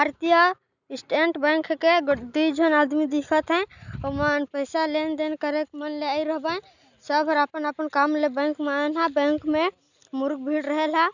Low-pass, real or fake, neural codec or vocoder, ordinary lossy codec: 7.2 kHz; real; none; none